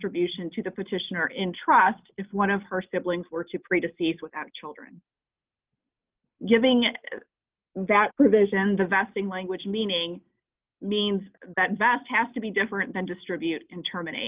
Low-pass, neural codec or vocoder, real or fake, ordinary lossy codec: 3.6 kHz; none; real; Opus, 24 kbps